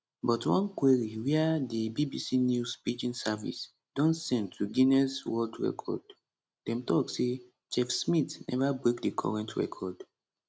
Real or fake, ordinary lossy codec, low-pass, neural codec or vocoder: real; none; none; none